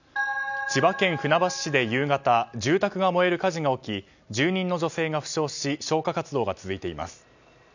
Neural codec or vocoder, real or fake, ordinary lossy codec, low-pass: none; real; none; 7.2 kHz